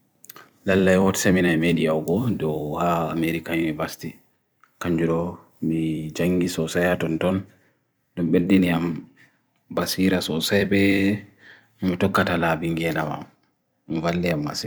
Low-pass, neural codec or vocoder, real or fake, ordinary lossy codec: none; vocoder, 44.1 kHz, 128 mel bands every 256 samples, BigVGAN v2; fake; none